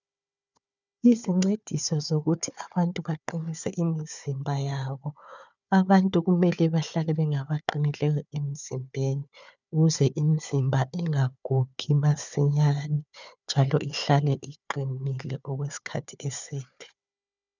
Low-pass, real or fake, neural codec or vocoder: 7.2 kHz; fake; codec, 16 kHz, 4 kbps, FunCodec, trained on Chinese and English, 50 frames a second